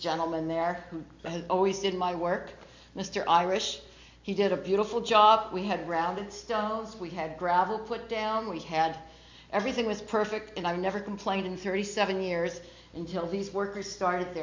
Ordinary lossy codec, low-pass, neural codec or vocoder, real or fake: MP3, 48 kbps; 7.2 kHz; none; real